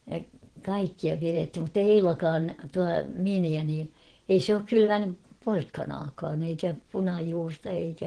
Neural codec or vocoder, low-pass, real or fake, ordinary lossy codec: vocoder, 22.05 kHz, 80 mel bands, WaveNeXt; 9.9 kHz; fake; Opus, 16 kbps